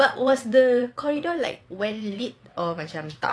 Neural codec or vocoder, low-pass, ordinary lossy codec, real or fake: vocoder, 22.05 kHz, 80 mel bands, WaveNeXt; none; none; fake